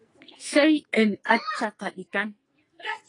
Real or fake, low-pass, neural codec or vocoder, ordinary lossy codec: fake; 10.8 kHz; codec, 44.1 kHz, 2.6 kbps, SNAC; AAC, 32 kbps